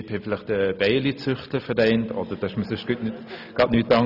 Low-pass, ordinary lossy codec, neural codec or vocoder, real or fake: 5.4 kHz; none; none; real